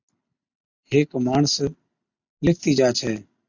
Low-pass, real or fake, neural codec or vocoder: 7.2 kHz; real; none